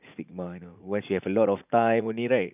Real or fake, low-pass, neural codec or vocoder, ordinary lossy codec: fake; 3.6 kHz; vocoder, 44.1 kHz, 80 mel bands, Vocos; none